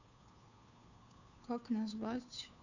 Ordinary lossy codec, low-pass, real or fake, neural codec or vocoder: none; 7.2 kHz; fake; codec, 16 kHz, 2 kbps, FunCodec, trained on Chinese and English, 25 frames a second